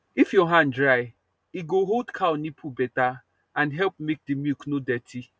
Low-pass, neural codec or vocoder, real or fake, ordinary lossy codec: none; none; real; none